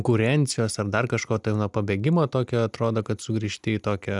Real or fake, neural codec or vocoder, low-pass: real; none; 10.8 kHz